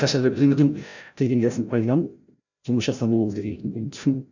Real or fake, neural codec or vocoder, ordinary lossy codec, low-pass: fake; codec, 16 kHz, 0.5 kbps, FreqCodec, larger model; none; 7.2 kHz